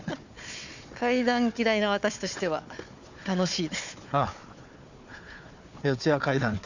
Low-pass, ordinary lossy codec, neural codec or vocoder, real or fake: 7.2 kHz; none; codec, 16 kHz, 4 kbps, FunCodec, trained on Chinese and English, 50 frames a second; fake